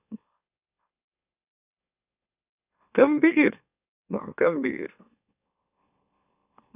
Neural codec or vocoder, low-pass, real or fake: autoencoder, 44.1 kHz, a latent of 192 numbers a frame, MeloTTS; 3.6 kHz; fake